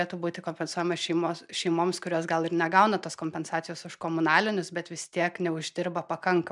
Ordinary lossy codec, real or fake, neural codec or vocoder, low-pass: MP3, 96 kbps; real; none; 10.8 kHz